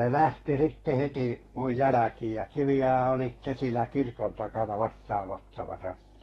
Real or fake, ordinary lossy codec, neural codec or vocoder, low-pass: fake; AAC, 32 kbps; codec, 32 kHz, 1.9 kbps, SNAC; 14.4 kHz